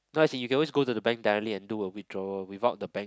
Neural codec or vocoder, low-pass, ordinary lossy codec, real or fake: none; none; none; real